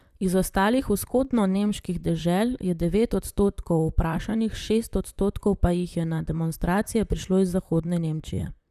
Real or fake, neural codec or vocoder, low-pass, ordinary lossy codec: fake; vocoder, 44.1 kHz, 128 mel bands, Pupu-Vocoder; 14.4 kHz; none